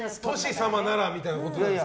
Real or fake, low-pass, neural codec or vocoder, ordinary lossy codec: real; none; none; none